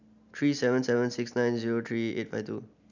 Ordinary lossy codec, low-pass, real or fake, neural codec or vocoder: none; 7.2 kHz; real; none